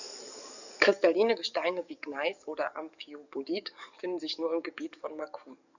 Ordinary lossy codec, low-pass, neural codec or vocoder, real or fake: none; 7.2 kHz; codec, 44.1 kHz, 7.8 kbps, DAC; fake